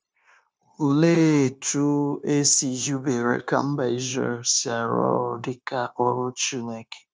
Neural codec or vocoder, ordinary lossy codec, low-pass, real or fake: codec, 16 kHz, 0.9 kbps, LongCat-Audio-Codec; none; none; fake